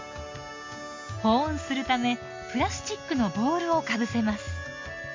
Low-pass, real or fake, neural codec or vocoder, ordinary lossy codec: 7.2 kHz; real; none; MP3, 48 kbps